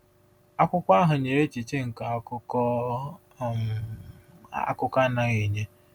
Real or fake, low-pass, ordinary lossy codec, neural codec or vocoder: real; 19.8 kHz; none; none